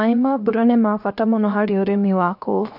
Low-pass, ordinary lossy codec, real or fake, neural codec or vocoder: 5.4 kHz; AAC, 48 kbps; fake; codec, 16 kHz, 0.7 kbps, FocalCodec